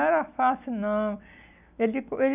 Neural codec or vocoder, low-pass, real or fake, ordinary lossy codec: none; 3.6 kHz; real; none